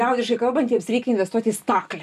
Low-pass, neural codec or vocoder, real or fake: 14.4 kHz; none; real